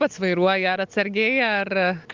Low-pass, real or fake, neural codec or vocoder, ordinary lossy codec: 7.2 kHz; real; none; Opus, 32 kbps